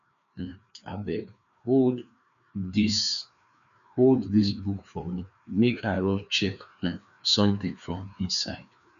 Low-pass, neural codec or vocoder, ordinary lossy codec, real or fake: 7.2 kHz; codec, 16 kHz, 2 kbps, FreqCodec, larger model; MP3, 96 kbps; fake